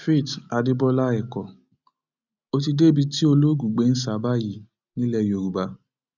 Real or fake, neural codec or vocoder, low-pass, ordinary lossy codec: real; none; 7.2 kHz; none